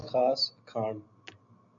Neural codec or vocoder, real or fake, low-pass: none; real; 7.2 kHz